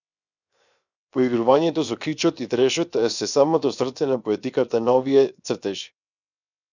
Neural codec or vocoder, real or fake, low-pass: codec, 16 kHz, 0.7 kbps, FocalCodec; fake; 7.2 kHz